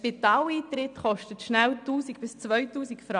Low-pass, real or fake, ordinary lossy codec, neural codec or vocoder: 9.9 kHz; real; none; none